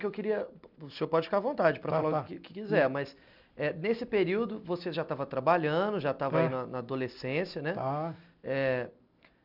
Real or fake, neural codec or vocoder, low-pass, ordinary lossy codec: real; none; 5.4 kHz; none